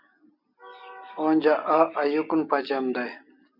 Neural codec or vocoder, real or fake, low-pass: none; real; 5.4 kHz